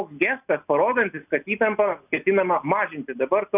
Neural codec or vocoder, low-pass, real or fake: none; 3.6 kHz; real